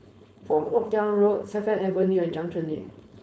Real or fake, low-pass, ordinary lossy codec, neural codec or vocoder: fake; none; none; codec, 16 kHz, 4.8 kbps, FACodec